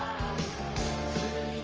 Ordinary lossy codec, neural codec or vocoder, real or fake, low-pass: Opus, 24 kbps; codec, 44.1 kHz, 7.8 kbps, Pupu-Codec; fake; 7.2 kHz